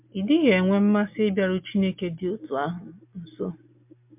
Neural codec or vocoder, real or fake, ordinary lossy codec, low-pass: none; real; MP3, 32 kbps; 3.6 kHz